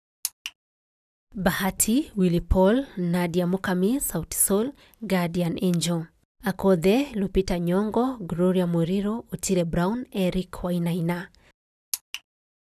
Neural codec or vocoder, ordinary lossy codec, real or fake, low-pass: none; none; real; 14.4 kHz